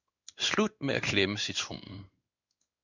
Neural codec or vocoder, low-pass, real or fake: codec, 16 kHz in and 24 kHz out, 1 kbps, XY-Tokenizer; 7.2 kHz; fake